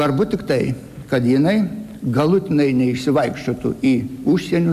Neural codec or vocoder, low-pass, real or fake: none; 14.4 kHz; real